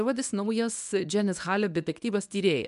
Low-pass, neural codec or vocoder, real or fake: 10.8 kHz; codec, 24 kHz, 0.9 kbps, WavTokenizer, medium speech release version 1; fake